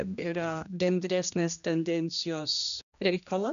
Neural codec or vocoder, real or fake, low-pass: codec, 16 kHz, 1 kbps, X-Codec, HuBERT features, trained on general audio; fake; 7.2 kHz